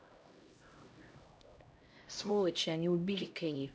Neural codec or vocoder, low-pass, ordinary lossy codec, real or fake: codec, 16 kHz, 0.5 kbps, X-Codec, HuBERT features, trained on LibriSpeech; none; none; fake